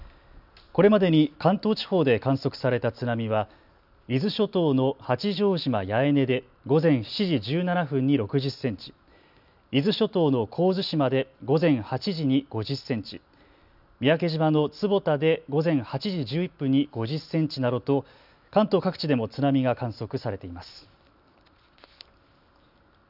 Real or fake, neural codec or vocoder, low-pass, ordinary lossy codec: real; none; 5.4 kHz; none